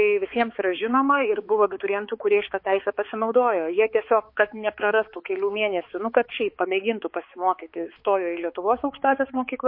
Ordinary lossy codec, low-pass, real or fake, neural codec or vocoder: MP3, 32 kbps; 5.4 kHz; fake; codec, 16 kHz, 4 kbps, X-Codec, HuBERT features, trained on balanced general audio